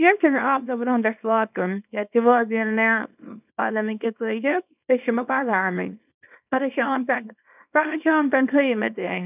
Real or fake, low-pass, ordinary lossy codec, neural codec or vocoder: fake; 3.6 kHz; none; codec, 24 kHz, 0.9 kbps, WavTokenizer, small release